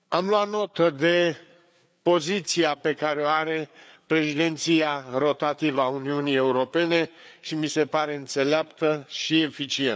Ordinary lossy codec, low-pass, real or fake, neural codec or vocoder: none; none; fake; codec, 16 kHz, 4 kbps, FreqCodec, larger model